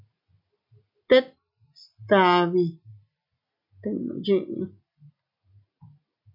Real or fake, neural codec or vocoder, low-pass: real; none; 5.4 kHz